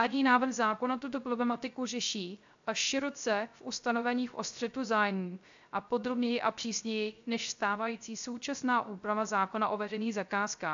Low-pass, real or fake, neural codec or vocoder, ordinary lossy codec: 7.2 kHz; fake; codec, 16 kHz, 0.3 kbps, FocalCodec; AAC, 64 kbps